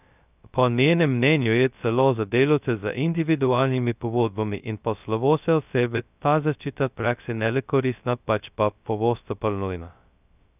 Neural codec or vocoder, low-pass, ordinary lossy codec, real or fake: codec, 16 kHz, 0.2 kbps, FocalCodec; 3.6 kHz; none; fake